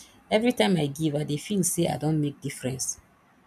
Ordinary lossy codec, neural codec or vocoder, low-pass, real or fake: none; none; 14.4 kHz; real